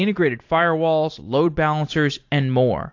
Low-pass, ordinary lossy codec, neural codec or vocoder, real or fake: 7.2 kHz; AAC, 48 kbps; vocoder, 44.1 kHz, 128 mel bands every 256 samples, BigVGAN v2; fake